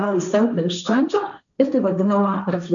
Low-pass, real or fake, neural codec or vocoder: 7.2 kHz; fake; codec, 16 kHz, 1.1 kbps, Voila-Tokenizer